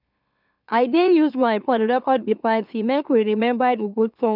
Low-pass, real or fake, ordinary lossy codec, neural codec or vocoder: 5.4 kHz; fake; none; autoencoder, 44.1 kHz, a latent of 192 numbers a frame, MeloTTS